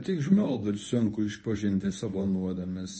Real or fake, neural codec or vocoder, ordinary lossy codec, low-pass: fake; codec, 24 kHz, 0.9 kbps, WavTokenizer, medium speech release version 1; MP3, 32 kbps; 9.9 kHz